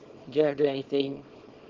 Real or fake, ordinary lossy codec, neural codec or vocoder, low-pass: fake; Opus, 16 kbps; codec, 24 kHz, 0.9 kbps, WavTokenizer, small release; 7.2 kHz